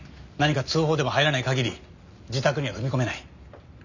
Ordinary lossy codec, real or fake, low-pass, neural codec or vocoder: none; real; 7.2 kHz; none